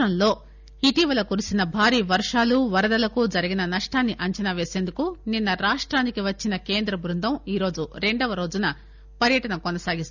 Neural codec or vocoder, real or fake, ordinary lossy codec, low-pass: none; real; none; 7.2 kHz